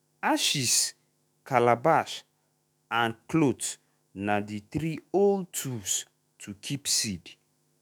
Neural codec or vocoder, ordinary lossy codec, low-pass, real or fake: autoencoder, 48 kHz, 128 numbers a frame, DAC-VAE, trained on Japanese speech; none; none; fake